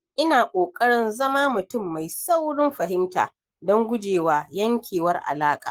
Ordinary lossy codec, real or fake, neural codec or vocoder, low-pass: Opus, 24 kbps; fake; codec, 44.1 kHz, 7.8 kbps, Pupu-Codec; 19.8 kHz